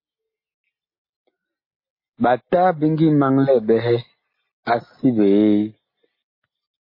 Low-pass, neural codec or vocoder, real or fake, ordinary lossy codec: 5.4 kHz; none; real; MP3, 24 kbps